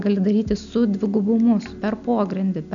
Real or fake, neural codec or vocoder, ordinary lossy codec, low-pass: real; none; MP3, 96 kbps; 7.2 kHz